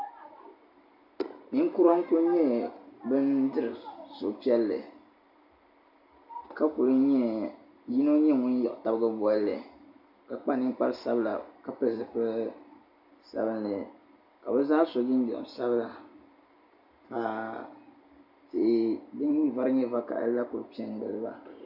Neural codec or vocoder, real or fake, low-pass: none; real; 5.4 kHz